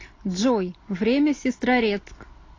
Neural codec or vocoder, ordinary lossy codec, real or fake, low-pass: none; AAC, 32 kbps; real; 7.2 kHz